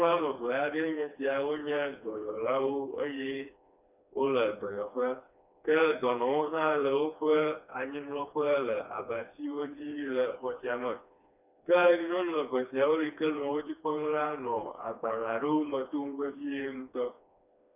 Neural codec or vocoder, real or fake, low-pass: codec, 16 kHz, 2 kbps, FreqCodec, smaller model; fake; 3.6 kHz